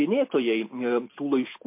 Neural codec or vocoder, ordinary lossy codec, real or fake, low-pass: none; MP3, 24 kbps; real; 3.6 kHz